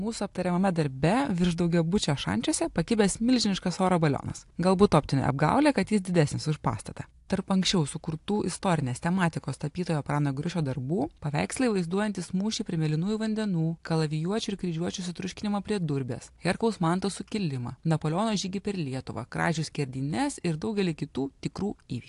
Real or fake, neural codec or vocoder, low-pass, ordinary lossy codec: real; none; 10.8 kHz; AAC, 64 kbps